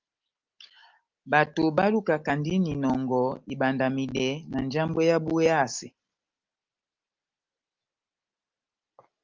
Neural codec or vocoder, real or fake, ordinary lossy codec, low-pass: none; real; Opus, 32 kbps; 7.2 kHz